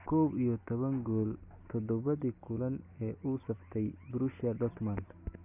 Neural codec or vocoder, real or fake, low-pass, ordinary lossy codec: none; real; 3.6 kHz; none